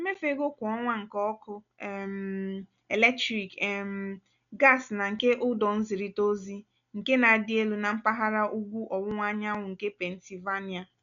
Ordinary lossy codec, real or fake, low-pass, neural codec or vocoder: none; real; 7.2 kHz; none